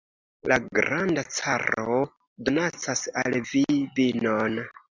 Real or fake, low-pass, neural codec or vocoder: real; 7.2 kHz; none